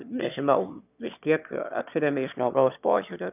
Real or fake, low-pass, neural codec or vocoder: fake; 3.6 kHz; autoencoder, 22.05 kHz, a latent of 192 numbers a frame, VITS, trained on one speaker